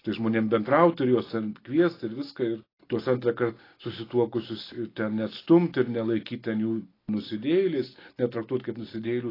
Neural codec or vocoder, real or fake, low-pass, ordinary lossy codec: none; real; 5.4 kHz; AAC, 24 kbps